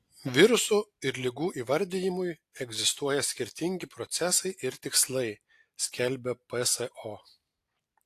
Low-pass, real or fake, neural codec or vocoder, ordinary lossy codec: 14.4 kHz; real; none; AAC, 64 kbps